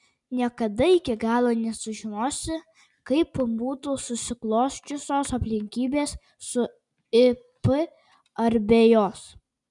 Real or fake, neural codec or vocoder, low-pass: real; none; 10.8 kHz